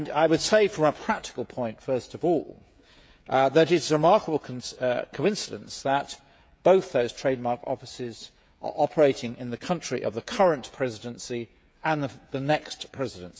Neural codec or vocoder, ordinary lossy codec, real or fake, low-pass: codec, 16 kHz, 16 kbps, FreqCodec, smaller model; none; fake; none